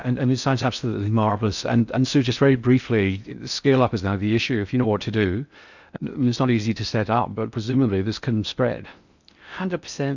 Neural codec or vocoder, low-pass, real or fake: codec, 16 kHz in and 24 kHz out, 0.6 kbps, FocalCodec, streaming, 4096 codes; 7.2 kHz; fake